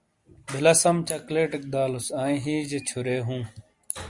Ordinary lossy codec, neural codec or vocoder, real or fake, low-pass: Opus, 64 kbps; none; real; 10.8 kHz